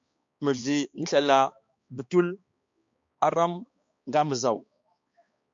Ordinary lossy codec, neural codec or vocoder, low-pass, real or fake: MP3, 48 kbps; codec, 16 kHz, 2 kbps, X-Codec, HuBERT features, trained on balanced general audio; 7.2 kHz; fake